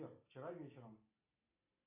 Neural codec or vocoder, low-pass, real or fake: none; 3.6 kHz; real